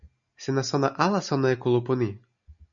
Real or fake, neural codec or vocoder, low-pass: real; none; 7.2 kHz